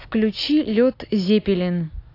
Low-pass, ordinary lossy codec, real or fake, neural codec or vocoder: 5.4 kHz; AAC, 32 kbps; real; none